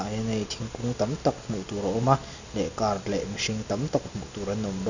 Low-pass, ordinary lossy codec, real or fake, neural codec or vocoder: 7.2 kHz; none; real; none